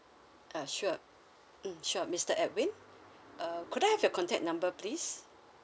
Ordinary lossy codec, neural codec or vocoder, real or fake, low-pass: none; none; real; none